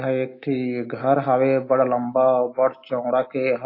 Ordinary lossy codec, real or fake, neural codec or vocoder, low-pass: AAC, 32 kbps; real; none; 5.4 kHz